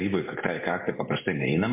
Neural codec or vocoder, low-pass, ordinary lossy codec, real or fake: none; 3.6 kHz; MP3, 24 kbps; real